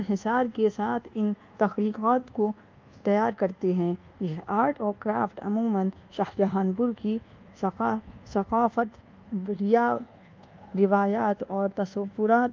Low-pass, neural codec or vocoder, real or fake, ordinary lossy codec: 7.2 kHz; codec, 24 kHz, 1.2 kbps, DualCodec; fake; Opus, 24 kbps